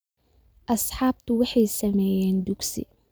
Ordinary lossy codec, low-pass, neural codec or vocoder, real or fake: none; none; none; real